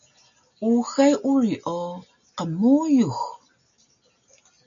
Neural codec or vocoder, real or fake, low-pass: none; real; 7.2 kHz